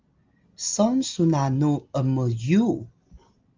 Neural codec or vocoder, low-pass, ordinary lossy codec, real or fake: none; 7.2 kHz; Opus, 32 kbps; real